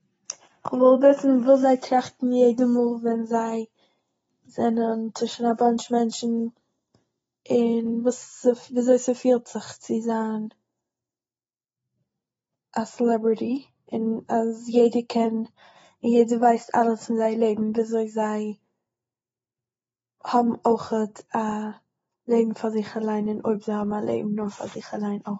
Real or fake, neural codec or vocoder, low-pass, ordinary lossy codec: real; none; 14.4 kHz; AAC, 24 kbps